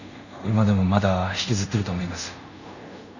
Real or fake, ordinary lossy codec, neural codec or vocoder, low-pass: fake; none; codec, 24 kHz, 0.5 kbps, DualCodec; 7.2 kHz